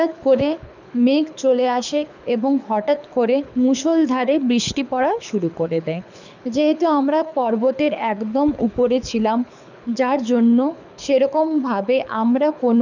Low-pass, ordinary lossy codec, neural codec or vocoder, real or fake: 7.2 kHz; none; codec, 24 kHz, 6 kbps, HILCodec; fake